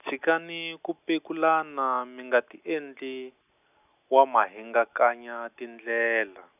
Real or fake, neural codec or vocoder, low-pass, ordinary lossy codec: real; none; 3.6 kHz; none